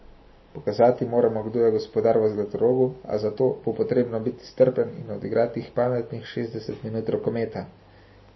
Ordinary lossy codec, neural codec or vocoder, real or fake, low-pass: MP3, 24 kbps; none; real; 7.2 kHz